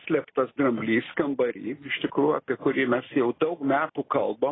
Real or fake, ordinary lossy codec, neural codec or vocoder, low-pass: real; AAC, 16 kbps; none; 7.2 kHz